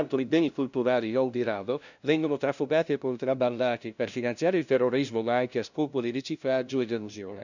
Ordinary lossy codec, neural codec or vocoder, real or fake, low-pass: none; codec, 16 kHz, 0.5 kbps, FunCodec, trained on LibriTTS, 25 frames a second; fake; 7.2 kHz